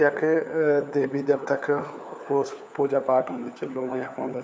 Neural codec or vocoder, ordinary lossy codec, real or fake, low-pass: codec, 16 kHz, 4 kbps, FunCodec, trained on LibriTTS, 50 frames a second; none; fake; none